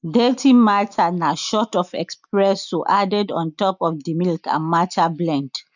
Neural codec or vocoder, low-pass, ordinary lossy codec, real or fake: none; 7.2 kHz; none; real